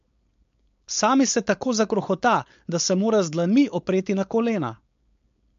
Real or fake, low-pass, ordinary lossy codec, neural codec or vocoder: fake; 7.2 kHz; MP3, 48 kbps; codec, 16 kHz, 4.8 kbps, FACodec